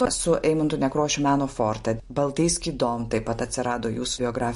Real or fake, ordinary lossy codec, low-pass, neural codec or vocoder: real; MP3, 48 kbps; 10.8 kHz; none